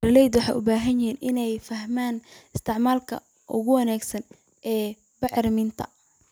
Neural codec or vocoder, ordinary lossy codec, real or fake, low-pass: none; none; real; none